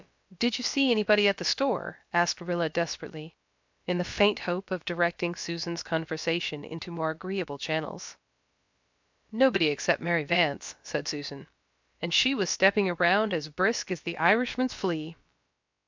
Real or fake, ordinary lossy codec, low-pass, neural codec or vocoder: fake; MP3, 64 kbps; 7.2 kHz; codec, 16 kHz, about 1 kbps, DyCAST, with the encoder's durations